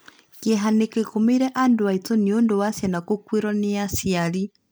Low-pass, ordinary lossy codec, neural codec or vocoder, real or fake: none; none; none; real